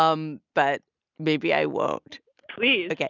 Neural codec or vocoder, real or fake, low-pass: none; real; 7.2 kHz